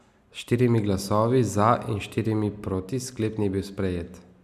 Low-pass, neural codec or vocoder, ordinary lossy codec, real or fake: 14.4 kHz; none; none; real